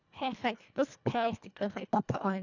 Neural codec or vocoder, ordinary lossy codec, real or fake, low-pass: codec, 24 kHz, 1.5 kbps, HILCodec; none; fake; 7.2 kHz